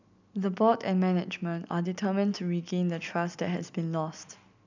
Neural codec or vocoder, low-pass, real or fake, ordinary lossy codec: none; 7.2 kHz; real; none